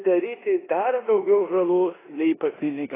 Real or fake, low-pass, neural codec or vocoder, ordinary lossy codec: fake; 3.6 kHz; codec, 16 kHz in and 24 kHz out, 0.9 kbps, LongCat-Audio-Codec, four codebook decoder; AAC, 16 kbps